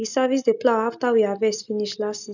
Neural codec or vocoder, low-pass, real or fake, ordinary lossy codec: none; 7.2 kHz; real; none